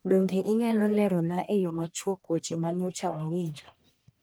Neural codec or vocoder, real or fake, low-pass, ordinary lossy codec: codec, 44.1 kHz, 1.7 kbps, Pupu-Codec; fake; none; none